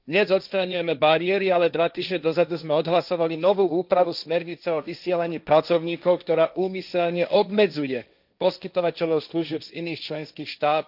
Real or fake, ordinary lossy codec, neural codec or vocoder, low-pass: fake; none; codec, 16 kHz, 1.1 kbps, Voila-Tokenizer; 5.4 kHz